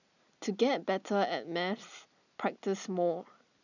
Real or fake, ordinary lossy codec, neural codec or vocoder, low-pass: real; none; none; 7.2 kHz